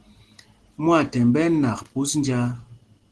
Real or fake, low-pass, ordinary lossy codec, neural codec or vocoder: real; 10.8 kHz; Opus, 16 kbps; none